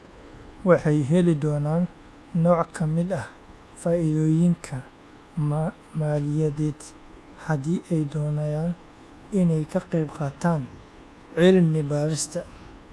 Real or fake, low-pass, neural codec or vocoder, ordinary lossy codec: fake; none; codec, 24 kHz, 1.2 kbps, DualCodec; none